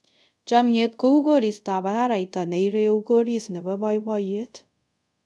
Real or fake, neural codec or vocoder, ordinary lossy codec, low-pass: fake; codec, 24 kHz, 0.5 kbps, DualCodec; none; none